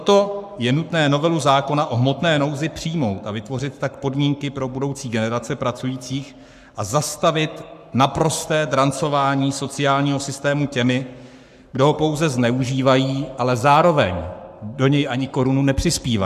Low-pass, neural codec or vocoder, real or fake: 14.4 kHz; codec, 44.1 kHz, 7.8 kbps, Pupu-Codec; fake